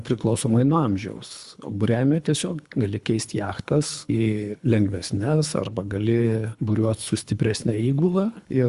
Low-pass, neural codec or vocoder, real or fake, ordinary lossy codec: 10.8 kHz; codec, 24 kHz, 3 kbps, HILCodec; fake; Opus, 64 kbps